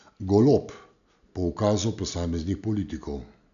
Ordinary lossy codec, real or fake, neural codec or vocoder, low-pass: MP3, 96 kbps; real; none; 7.2 kHz